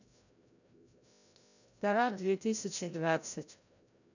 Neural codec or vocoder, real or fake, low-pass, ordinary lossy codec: codec, 16 kHz, 0.5 kbps, FreqCodec, larger model; fake; 7.2 kHz; none